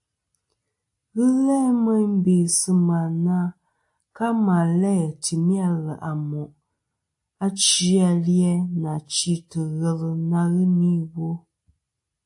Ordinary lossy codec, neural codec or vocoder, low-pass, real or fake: AAC, 64 kbps; none; 10.8 kHz; real